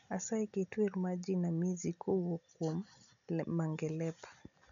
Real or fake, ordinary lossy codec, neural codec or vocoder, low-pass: real; none; none; 7.2 kHz